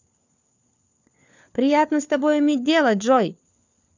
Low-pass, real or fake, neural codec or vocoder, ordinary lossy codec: 7.2 kHz; fake; codec, 16 kHz, 4 kbps, FunCodec, trained on LibriTTS, 50 frames a second; none